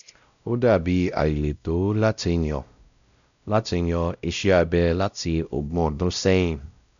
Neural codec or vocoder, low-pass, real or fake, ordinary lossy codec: codec, 16 kHz, 0.5 kbps, X-Codec, WavLM features, trained on Multilingual LibriSpeech; 7.2 kHz; fake; none